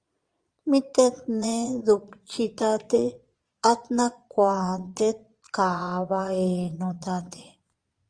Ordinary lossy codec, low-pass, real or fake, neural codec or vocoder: Opus, 32 kbps; 9.9 kHz; fake; vocoder, 22.05 kHz, 80 mel bands, Vocos